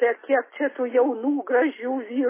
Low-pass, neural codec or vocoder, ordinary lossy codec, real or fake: 3.6 kHz; none; MP3, 16 kbps; real